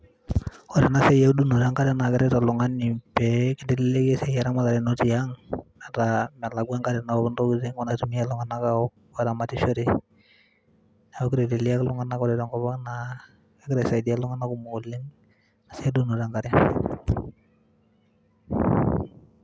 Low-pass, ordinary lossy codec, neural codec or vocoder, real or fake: none; none; none; real